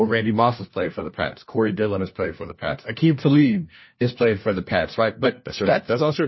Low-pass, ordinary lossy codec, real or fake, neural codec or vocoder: 7.2 kHz; MP3, 24 kbps; fake; codec, 16 kHz, 1 kbps, FunCodec, trained on LibriTTS, 50 frames a second